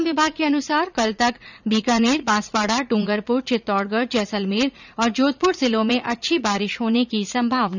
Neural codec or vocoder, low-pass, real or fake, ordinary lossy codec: vocoder, 44.1 kHz, 80 mel bands, Vocos; 7.2 kHz; fake; none